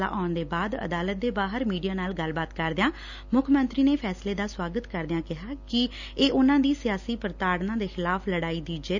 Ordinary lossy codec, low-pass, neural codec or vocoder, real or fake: none; 7.2 kHz; none; real